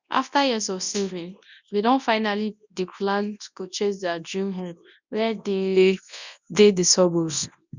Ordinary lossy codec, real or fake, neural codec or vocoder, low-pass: none; fake; codec, 24 kHz, 0.9 kbps, WavTokenizer, large speech release; 7.2 kHz